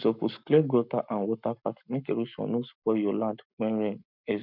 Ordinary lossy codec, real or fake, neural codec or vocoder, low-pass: none; fake; codec, 44.1 kHz, 7.8 kbps, Pupu-Codec; 5.4 kHz